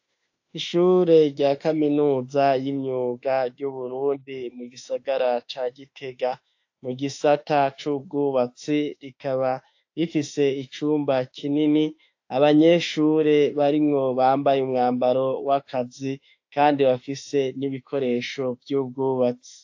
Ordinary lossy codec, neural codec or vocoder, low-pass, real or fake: AAC, 48 kbps; autoencoder, 48 kHz, 32 numbers a frame, DAC-VAE, trained on Japanese speech; 7.2 kHz; fake